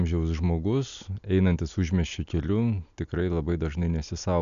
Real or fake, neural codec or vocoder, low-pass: real; none; 7.2 kHz